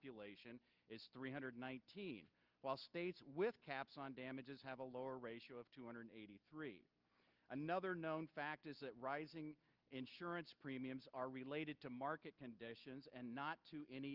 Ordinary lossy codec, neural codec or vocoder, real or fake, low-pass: Opus, 24 kbps; none; real; 5.4 kHz